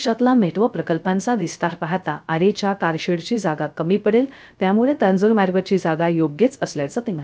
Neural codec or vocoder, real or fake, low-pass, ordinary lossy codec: codec, 16 kHz, 0.3 kbps, FocalCodec; fake; none; none